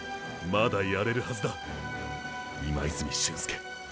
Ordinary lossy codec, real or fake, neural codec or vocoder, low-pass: none; real; none; none